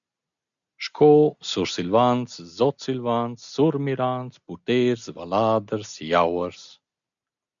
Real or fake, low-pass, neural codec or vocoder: real; 7.2 kHz; none